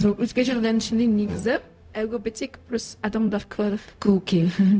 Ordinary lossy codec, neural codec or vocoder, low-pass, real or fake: none; codec, 16 kHz, 0.4 kbps, LongCat-Audio-Codec; none; fake